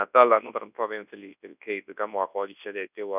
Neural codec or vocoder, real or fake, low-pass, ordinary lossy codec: codec, 24 kHz, 0.9 kbps, WavTokenizer, large speech release; fake; 3.6 kHz; none